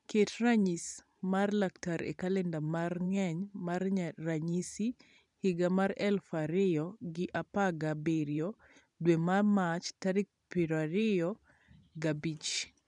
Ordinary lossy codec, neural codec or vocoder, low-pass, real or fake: none; vocoder, 44.1 kHz, 128 mel bands every 512 samples, BigVGAN v2; 10.8 kHz; fake